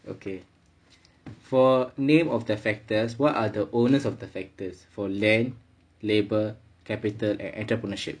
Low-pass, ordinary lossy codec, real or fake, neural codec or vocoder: 9.9 kHz; MP3, 96 kbps; real; none